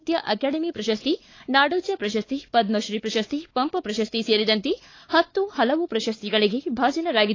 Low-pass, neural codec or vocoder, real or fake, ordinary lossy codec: 7.2 kHz; codec, 16 kHz, 4 kbps, X-Codec, WavLM features, trained on Multilingual LibriSpeech; fake; AAC, 32 kbps